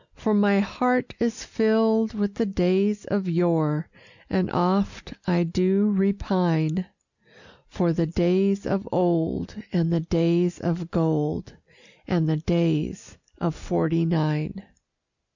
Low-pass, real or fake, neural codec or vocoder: 7.2 kHz; real; none